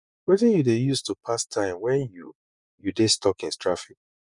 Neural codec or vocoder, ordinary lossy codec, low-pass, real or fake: vocoder, 24 kHz, 100 mel bands, Vocos; none; 10.8 kHz; fake